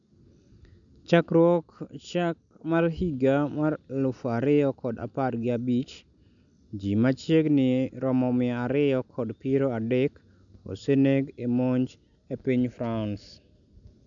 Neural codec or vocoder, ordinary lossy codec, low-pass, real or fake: none; none; 7.2 kHz; real